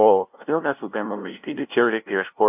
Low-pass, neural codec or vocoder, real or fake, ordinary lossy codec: 3.6 kHz; codec, 16 kHz, 0.5 kbps, FunCodec, trained on LibriTTS, 25 frames a second; fake; AAC, 32 kbps